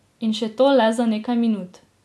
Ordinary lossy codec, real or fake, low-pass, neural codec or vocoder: none; real; none; none